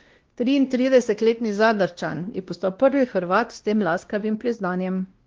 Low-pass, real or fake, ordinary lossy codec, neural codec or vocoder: 7.2 kHz; fake; Opus, 16 kbps; codec, 16 kHz, 1 kbps, X-Codec, WavLM features, trained on Multilingual LibriSpeech